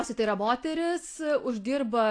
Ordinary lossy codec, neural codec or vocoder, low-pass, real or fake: AAC, 48 kbps; none; 9.9 kHz; real